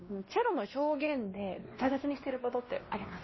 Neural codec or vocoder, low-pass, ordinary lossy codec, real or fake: codec, 16 kHz, 1 kbps, X-Codec, WavLM features, trained on Multilingual LibriSpeech; 7.2 kHz; MP3, 24 kbps; fake